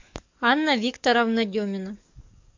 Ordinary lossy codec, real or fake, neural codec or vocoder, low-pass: MP3, 64 kbps; fake; codec, 44.1 kHz, 7.8 kbps, DAC; 7.2 kHz